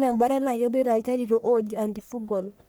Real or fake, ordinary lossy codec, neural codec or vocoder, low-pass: fake; none; codec, 44.1 kHz, 1.7 kbps, Pupu-Codec; none